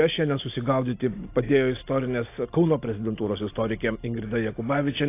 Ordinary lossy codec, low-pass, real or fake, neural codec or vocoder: AAC, 24 kbps; 3.6 kHz; real; none